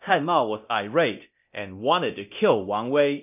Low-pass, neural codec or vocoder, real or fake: 3.6 kHz; codec, 24 kHz, 0.9 kbps, DualCodec; fake